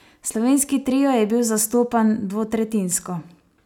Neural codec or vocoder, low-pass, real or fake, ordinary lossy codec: none; 19.8 kHz; real; none